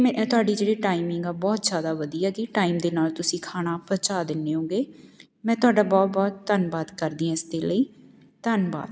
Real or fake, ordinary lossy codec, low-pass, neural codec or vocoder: real; none; none; none